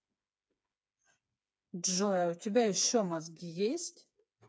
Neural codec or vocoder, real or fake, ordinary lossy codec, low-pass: codec, 16 kHz, 4 kbps, FreqCodec, smaller model; fake; none; none